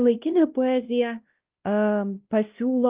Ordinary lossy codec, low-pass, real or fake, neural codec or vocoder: Opus, 32 kbps; 3.6 kHz; fake; codec, 16 kHz, 1 kbps, X-Codec, WavLM features, trained on Multilingual LibriSpeech